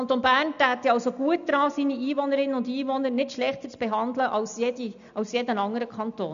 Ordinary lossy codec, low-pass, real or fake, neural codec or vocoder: none; 7.2 kHz; real; none